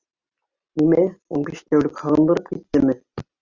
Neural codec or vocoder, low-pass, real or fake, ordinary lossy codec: none; 7.2 kHz; real; Opus, 64 kbps